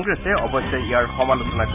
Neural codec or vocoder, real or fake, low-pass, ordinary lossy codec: none; real; 3.6 kHz; none